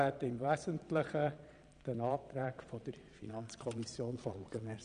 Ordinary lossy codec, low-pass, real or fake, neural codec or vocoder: none; 9.9 kHz; fake; vocoder, 22.05 kHz, 80 mel bands, Vocos